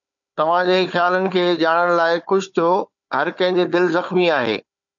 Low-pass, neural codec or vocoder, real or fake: 7.2 kHz; codec, 16 kHz, 4 kbps, FunCodec, trained on Chinese and English, 50 frames a second; fake